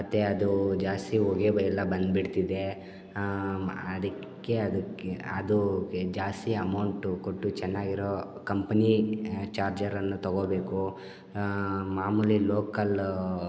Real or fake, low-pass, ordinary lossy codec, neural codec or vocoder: real; none; none; none